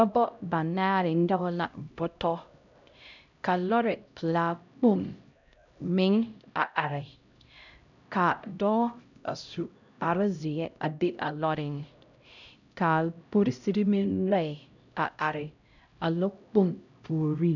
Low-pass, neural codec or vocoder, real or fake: 7.2 kHz; codec, 16 kHz, 0.5 kbps, X-Codec, HuBERT features, trained on LibriSpeech; fake